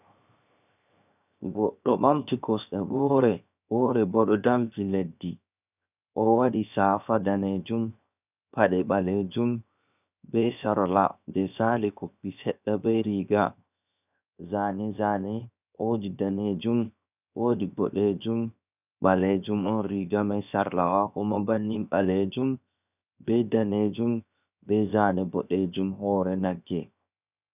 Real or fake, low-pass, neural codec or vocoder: fake; 3.6 kHz; codec, 16 kHz, 0.7 kbps, FocalCodec